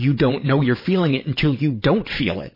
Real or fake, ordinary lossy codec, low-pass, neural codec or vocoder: fake; MP3, 24 kbps; 5.4 kHz; vocoder, 22.05 kHz, 80 mel bands, WaveNeXt